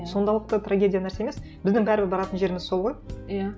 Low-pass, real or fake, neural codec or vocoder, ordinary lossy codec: none; real; none; none